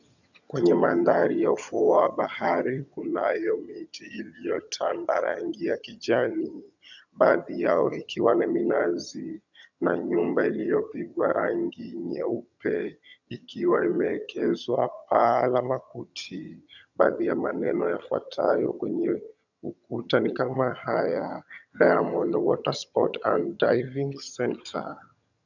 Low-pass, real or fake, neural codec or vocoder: 7.2 kHz; fake; vocoder, 22.05 kHz, 80 mel bands, HiFi-GAN